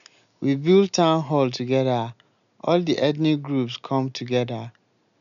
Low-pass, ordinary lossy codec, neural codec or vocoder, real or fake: 7.2 kHz; none; none; real